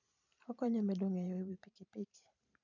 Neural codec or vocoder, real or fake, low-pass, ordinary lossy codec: vocoder, 44.1 kHz, 128 mel bands every 512 samples, BigVGAN v2; fake; 7.2 kHz; none